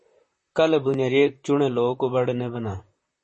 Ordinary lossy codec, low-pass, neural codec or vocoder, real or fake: MP3, 32 kbps; 10.8 kHz; vocoder, 44.1 kHz, 128 mel bands, Pupu-Vocoder; fake